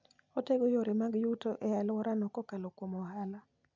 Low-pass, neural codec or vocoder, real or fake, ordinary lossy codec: 7.2 kHz; none; real; MP3, 64 kbps